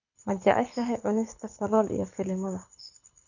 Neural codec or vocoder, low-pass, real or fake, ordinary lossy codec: codec, 24 kHz, 6 kbps, HILCodec; 7.2 kHz; fake; none